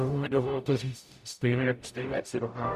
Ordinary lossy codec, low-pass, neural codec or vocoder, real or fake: Opus, 64 kbps; 14.4 kHz; codec, 44.1 kHz, 0.9 kbps, DAC; fake